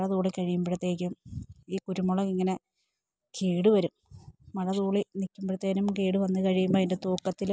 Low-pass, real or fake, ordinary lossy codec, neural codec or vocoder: none; real; none; none